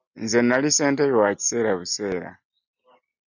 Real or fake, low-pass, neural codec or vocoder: real; 7.2 kHz; none